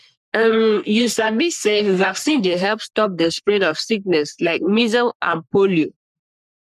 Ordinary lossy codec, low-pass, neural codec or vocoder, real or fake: none; 14.4 kHz; codec, 44.1 kHz, 3.4 kbps, Pupu-Codec; fake